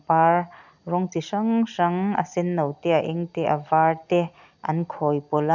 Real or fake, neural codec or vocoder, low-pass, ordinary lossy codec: real; none; 7.2 kHz; none